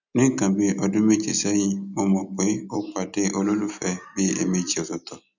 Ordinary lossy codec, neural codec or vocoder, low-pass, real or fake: none; none; 7.2 kHz; real